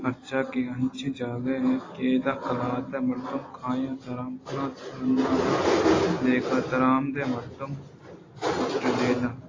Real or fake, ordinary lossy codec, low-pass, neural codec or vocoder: real; AAC, 32 kbps; 7.2 kHz; none